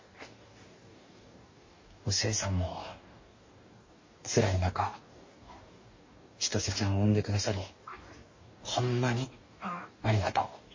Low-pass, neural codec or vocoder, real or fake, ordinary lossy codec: 7.2 kHz; codec, 44.1 kHz, 2.6 kbps, DAC; fake; MP3, 32 kbps